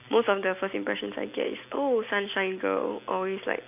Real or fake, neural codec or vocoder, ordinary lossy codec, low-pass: real; none; none; 3.6 kHz